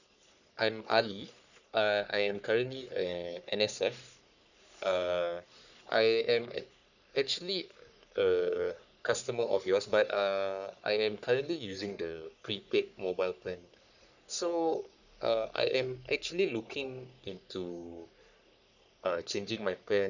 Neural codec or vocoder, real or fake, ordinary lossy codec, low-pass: codec, 44.1 kHz, 3.4 kbps, Pupu-Codec; fake; none; 7.2 kHz